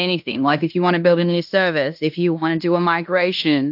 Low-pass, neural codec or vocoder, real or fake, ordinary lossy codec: 5.4 kHz; codec, 16 kHz in and 24 kHz out, 0.9 kbps, LongCat-Audio-Codec, fine tuned four codebook decoder; fake; AAC, 48 kbps